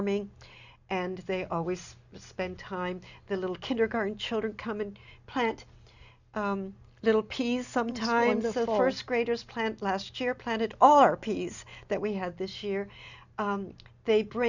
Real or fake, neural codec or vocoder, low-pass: real; none; 7.2 kHz